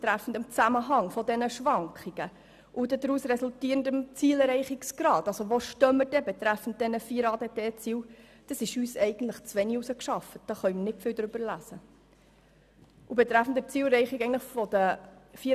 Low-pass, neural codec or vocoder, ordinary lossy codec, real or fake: 14.4 kHz; none; none; real